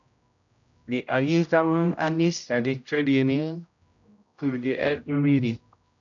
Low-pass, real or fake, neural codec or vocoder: 7.2 kHz; fake; codec, 16 kHz, 0.5 kbps, X-Codec, HuBERT features, trained on general audio